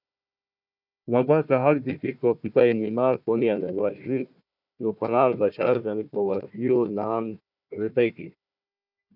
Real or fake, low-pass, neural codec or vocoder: fake; 5.4 kHz; codec, 16 kHz, 1 kbps, FunCodec, trained on Chinese and English, 50 frames a second